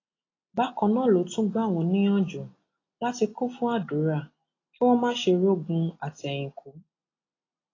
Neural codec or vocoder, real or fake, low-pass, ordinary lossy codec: none; real; 7.2 kHz; AAC, 32 kbps